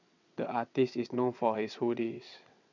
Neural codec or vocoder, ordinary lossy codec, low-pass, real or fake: vocoder, 22.05 kHz, 80 mel bands, WaveNeXt; none; 7.2 kHz; fake